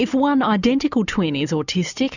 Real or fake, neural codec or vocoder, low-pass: real; none; 7.2 kHz